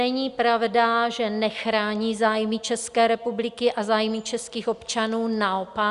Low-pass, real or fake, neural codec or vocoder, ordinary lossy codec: 10.8 kHz; real; none; Opus, 64 kbps